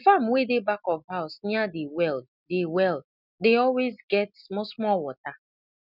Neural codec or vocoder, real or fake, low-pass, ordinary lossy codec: none; real; 5.4 kHz; none